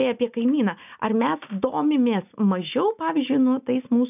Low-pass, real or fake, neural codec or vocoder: 3.6 kHz; real; none